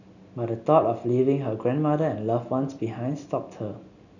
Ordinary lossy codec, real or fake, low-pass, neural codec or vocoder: none; real; 7.2 kHz; none